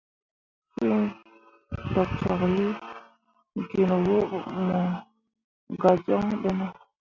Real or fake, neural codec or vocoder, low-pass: real; none; 7.2 kHz